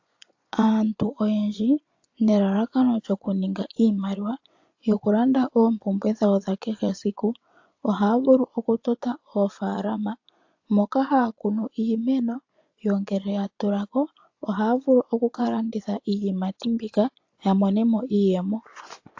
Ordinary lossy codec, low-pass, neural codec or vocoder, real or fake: AAC, 48 kbps; 7.2 kHz; vocoder, 24 kHz, 100 mel bands, Vocos; fake